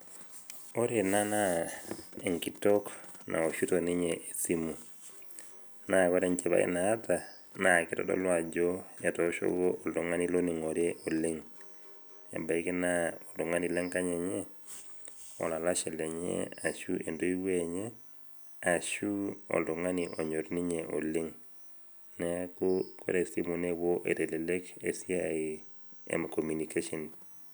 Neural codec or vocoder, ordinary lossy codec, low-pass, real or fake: none; none; none; real